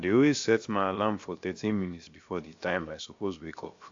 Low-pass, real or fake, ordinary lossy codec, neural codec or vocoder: 7.2 kHz; fake; AAC, 48 kbps; codec, 16 kHz, 0.7 kbps, FocalCodec